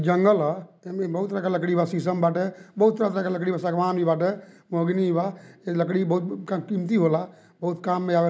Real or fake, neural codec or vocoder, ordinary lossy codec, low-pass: real; none; none; none